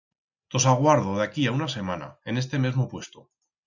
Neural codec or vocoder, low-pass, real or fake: none; 7.2 kHz; real